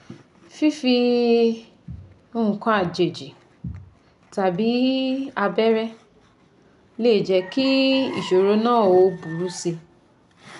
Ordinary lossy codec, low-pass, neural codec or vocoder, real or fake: none; 10.8 kHz; none; real